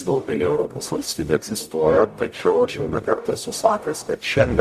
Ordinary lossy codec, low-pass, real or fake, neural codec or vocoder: Opus, 64 kbps; 14.4 kHz; fake; codec, 44.1 kHz, 0.9 kbps, DAC